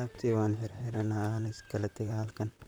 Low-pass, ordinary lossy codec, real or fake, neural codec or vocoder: none; none; fake; vocoder, 44.1 kHz, 128 mel bands, Pupu-Vocoder